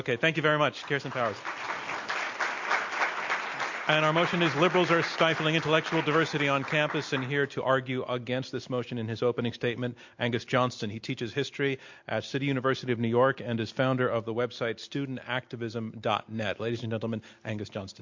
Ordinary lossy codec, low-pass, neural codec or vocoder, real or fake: MP3, 48 kbps; 7.2 kHz; none; real